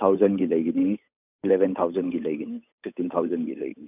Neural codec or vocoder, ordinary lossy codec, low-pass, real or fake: codec, 16 kHz, 8 kbps, FunCodec, trained on Chinese and English, 25 frames a second; none; 3.6 kHz; fake